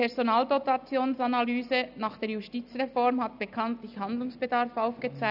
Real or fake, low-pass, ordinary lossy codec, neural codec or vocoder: real; 5.4 kHz; none; none